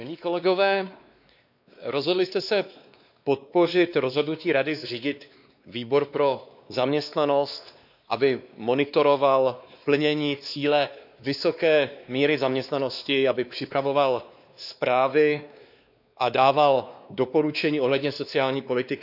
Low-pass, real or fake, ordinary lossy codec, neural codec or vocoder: 5.4 kHz; fake; none; codec, 16 kHz, 2 kbps, X-Codec, WavLM features, trained on Multilingual LibriSpeech